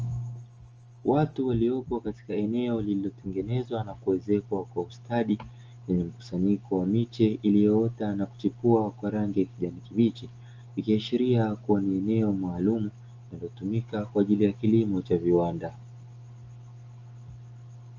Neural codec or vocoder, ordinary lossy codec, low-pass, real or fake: none; Opus, 24 kbps; 7.2 kHz; real